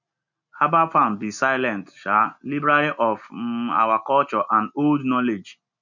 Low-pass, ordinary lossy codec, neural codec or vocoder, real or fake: 7.2 kHz; none; none; real